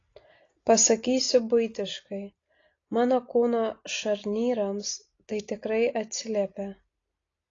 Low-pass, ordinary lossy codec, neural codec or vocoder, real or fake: 7.2 kHz; AAC, 32 kbps; none; real